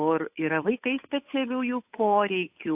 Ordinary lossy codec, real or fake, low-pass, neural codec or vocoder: AAC, 32 kbps; fake; 3.6 kHz; codec, 16 kHz, 8 kbps, FunCodec, trained on Chinese and English, 25 frames a second